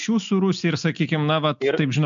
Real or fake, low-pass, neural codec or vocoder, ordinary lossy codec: real; 7.2 kHz; none; MP3, 64 kbps